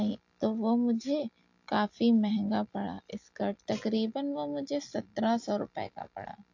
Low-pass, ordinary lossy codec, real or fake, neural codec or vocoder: 7.2 kHz; AAC, 48 kbps; real; none